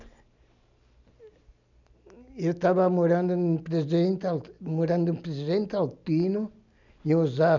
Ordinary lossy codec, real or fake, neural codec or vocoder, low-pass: none; real; none; 7.2 kHz